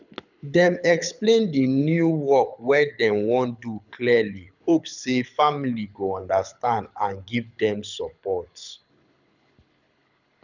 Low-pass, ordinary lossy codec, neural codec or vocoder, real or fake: 7.2 kHz; none; codec, 24 kHz, 6 kbps, HILCodec; fake